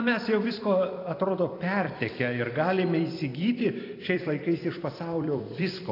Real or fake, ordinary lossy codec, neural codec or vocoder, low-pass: fake; AAC, 32 kbps; vocoder, 44.1 kHz, 128 mel bands every 256 samples, BigVGAN v2; 5.4 kHz